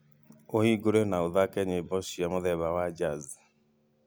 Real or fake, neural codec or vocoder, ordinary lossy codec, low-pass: real; none; none; none